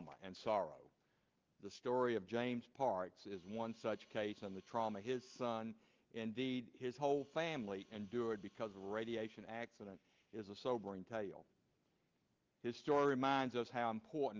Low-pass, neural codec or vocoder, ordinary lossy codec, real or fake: 7.2 kHz; none; Opus, 32 kbps; real